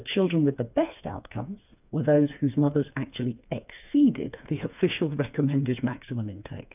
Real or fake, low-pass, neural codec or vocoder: fake; 3.6 kHz; codec, 16 kHz, 4 kbps, FreqCodec, smaller model